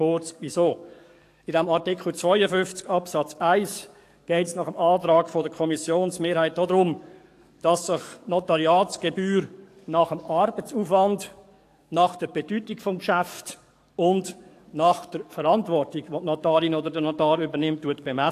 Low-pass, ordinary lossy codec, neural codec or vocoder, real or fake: 14.4 kHz; AAC, 64 kbps; codec, 44.1 kHz, 7.8 kbps, DAC; fake